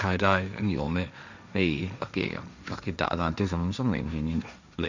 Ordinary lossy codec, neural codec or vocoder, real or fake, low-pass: none; codec, 16 kHz, 1.1 kbps, Voila-Tokenizer; fake; 7.2 kHz